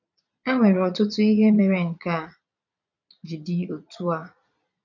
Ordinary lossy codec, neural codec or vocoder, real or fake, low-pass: none; vocoder, 22.05 kHz, 80 mel bands, Vocos; fake; 7.2 kHz